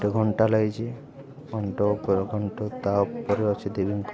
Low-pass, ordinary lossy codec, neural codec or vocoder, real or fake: none; none; none; real